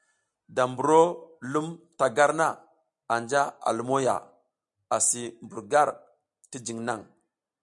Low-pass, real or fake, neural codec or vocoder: 10.8 kHz; real; none